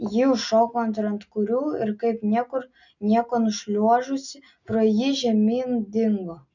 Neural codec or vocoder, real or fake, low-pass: none; real; 7.2 kHz